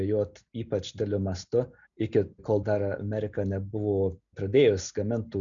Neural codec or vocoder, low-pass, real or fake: none; 7.2 kHz; real